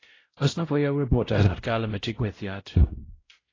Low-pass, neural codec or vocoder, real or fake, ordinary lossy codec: 7.2 kHz; codec, 16 kHz, 0.5 kbps, X-Codec, WavLM features, trained on Multilingual LibriSpeech; fake; AAC, 32 kbps